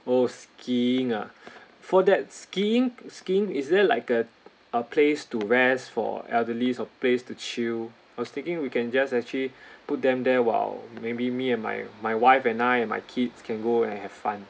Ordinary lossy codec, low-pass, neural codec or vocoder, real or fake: none; none; none; real